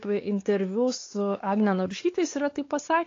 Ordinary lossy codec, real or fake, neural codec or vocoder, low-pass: AAC, 32 kbps; fake; codec, 16 kHz, 4 kbps, X-Codec, WavLM features, trained on Multilingual LibriSpeech; 7.2 kHz